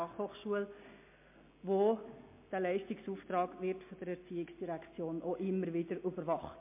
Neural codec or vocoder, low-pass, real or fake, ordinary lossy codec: none; 3.6 kHz; real; MP3, 24 kbps